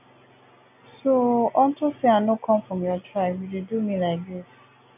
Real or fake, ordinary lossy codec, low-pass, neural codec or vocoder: real; none; 3.6 kHz; none